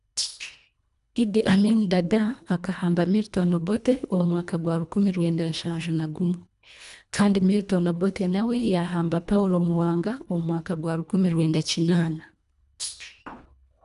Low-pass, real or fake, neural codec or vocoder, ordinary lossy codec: 10.8 kHz; fake; codec, 24 kHz, 1.5 kbps, HILCodec; none